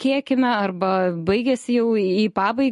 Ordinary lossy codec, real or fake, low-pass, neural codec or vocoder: MP3, 48 kbps; real; 14.4 kHz; none